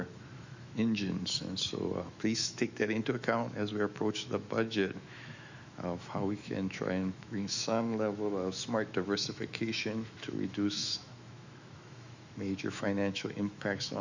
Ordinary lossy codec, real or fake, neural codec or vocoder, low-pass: Opus, 64 kbps; fake; autoencoder, 48 kHz, 128 numbers a frame, DAC-VAE, trained on Japanese speech; 7.2 kHz